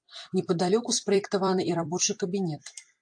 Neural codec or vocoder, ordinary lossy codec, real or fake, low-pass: vocoder, 44.1 kHz, 128 mel bands every 256 samples, BigVGAN v2; AAC, 64 kbps; fake; 9.9 kHz